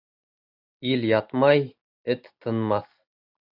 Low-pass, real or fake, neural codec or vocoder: 5.4 kHz; real; none